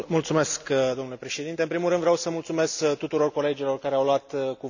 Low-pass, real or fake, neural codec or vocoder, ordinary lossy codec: 7.2 kHz; real; none; none